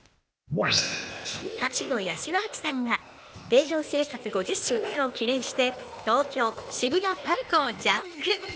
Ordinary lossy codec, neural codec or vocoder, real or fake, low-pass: none; codec, 16 kHz, 0.8 kbps, ZipCodec; fake; none